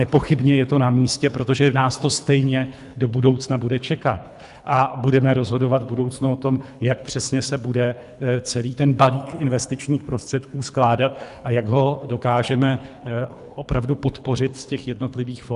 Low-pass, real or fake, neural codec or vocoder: 10.8 kHz; fake; codec, 24 kHz, 3 kbps, HILCodec